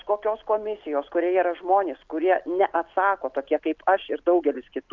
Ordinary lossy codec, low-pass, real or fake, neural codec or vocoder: Opus, 24 kbps; 7.2 kHz; real; none